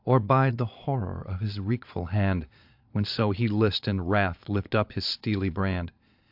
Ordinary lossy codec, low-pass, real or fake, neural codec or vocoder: AAC, 48 kbps; 5.4 kHz; fake; vocoder, 22.05 kHz, 80 mel bands, Vocos